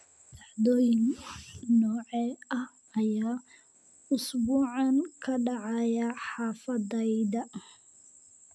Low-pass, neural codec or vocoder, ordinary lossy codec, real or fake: none; none; none; real